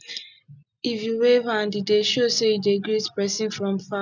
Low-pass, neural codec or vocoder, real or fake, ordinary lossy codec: 7.2 kHz; none; real; none